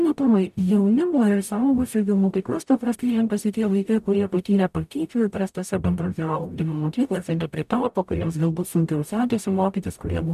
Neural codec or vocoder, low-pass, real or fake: codec, 44.1 kHz, 0.9 kbps, DAC; 14.4 kHz; fake